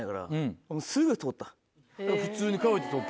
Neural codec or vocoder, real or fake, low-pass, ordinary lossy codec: none; real; none; none